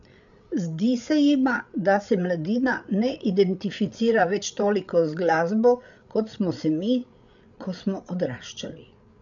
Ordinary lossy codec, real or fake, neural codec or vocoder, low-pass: none; fake; codec, 16 kHz, 8 kbps, FreqCodec, larger model; 7.2 kHz